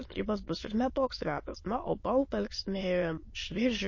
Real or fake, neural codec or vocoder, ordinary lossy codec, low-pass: fake; autoencoder, 22.05 kHz, a latent of 192 numbers a frame, VITS, trained on many speakers; MP3, 32 kbps; 7.2 kHz